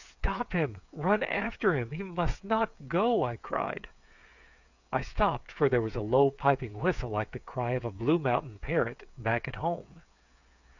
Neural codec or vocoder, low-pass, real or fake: codec, 16 kHz, 8 kbps, FreqCodec, smaller model; 7.2 kHz; fake